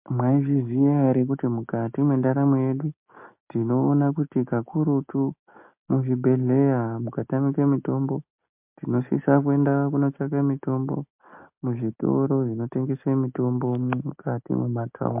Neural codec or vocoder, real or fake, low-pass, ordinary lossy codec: none; real; 3.6 kHz; MP3, 32 kbps